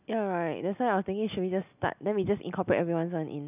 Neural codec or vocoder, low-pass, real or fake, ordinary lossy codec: none; 3.6 kHz; real; none